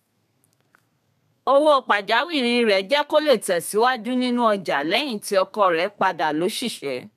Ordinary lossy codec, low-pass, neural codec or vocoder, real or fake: none; 14.4 kHz; codec, 32 kHz, 1.9 kbps, SNAC; fake